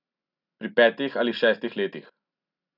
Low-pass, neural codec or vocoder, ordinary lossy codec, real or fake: 5.4 kHz; none; none; real